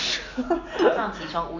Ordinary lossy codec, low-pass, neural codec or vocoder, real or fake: none; 7.2 kHz; none; real